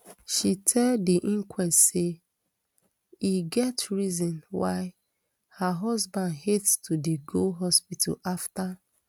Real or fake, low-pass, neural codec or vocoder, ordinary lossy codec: real; none; none; none